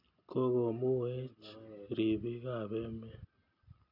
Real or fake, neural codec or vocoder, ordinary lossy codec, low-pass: real; none; none; 5.4 kHz